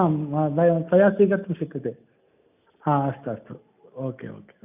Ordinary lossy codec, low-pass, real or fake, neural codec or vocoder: none; 3.6 kHz; real; none